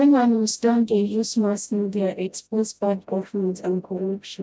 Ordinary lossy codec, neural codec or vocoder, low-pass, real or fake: none; codec, 16 kHz, 0.5 kbps, FreqCodec, smaller model; none; fake